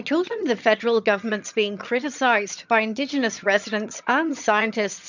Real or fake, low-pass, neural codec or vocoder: fake; 7.2 kHz; vocoder, 22.05 kHz, 80 mel bands, HiFi-GAN